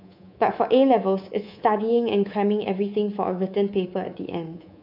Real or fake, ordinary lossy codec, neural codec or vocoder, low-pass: fake; none; codec, 24 kHz, 3.1 kbps, DualCodec; 5.4 kHz